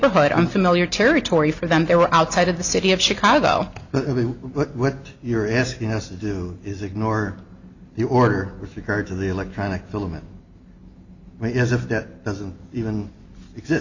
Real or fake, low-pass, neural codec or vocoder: real; 7.2 kHz; none